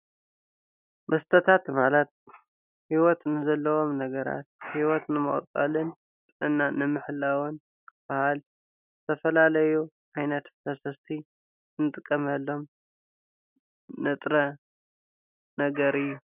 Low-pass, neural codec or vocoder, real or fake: 3.6 kHz; none; real